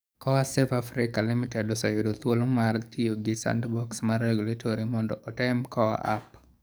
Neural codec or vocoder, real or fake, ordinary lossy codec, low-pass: codec, 44.1 kHz, 7.8 kbps, DAC; fake; none; none